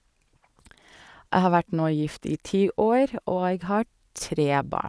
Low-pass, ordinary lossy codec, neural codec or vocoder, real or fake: none; none; vocoder, 22.05 kHz, 80 mel bands, Vocos; fake